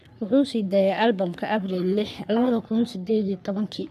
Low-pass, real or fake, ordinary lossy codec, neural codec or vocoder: 14.4 kHz; fake; none; codec, 44.1 kHz, 3.4 kbps, Pupu-Codec